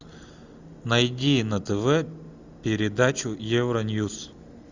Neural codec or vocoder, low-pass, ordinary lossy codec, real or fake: none; 7.2 kHz; Opus, 64 kbps; real